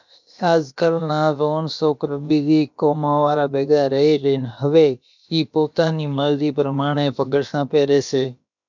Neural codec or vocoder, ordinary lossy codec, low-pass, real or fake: codec, 16 kHz, about 1 kbps, DyCAST, with the encoder's durations; MP3, 64 kbps; 7.2 kHz; fake